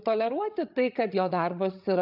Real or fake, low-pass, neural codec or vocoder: fake; 5.4 kHz; codec, 16 kHz, 8 kbps, FreqCodec, larger model